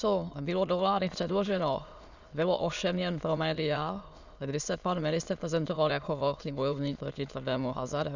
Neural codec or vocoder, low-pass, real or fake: autoencoder, 22.05 kHz, a latent of 192 numbers a frame, VITS, trained on many speakers; 7.2 kHz; fake